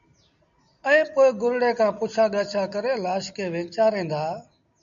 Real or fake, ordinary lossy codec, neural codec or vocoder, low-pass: real; MP3, 96 kbps; none; 7.2 kHz